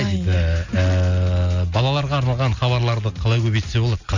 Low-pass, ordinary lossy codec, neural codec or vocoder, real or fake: 7.2 kHz; none; none; real